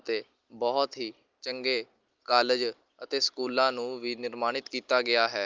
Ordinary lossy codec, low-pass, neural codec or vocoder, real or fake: Opus, 24 kbps; 7.2 kHz; none; real